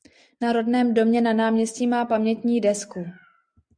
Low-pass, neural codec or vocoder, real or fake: 9.9 kHz; none; real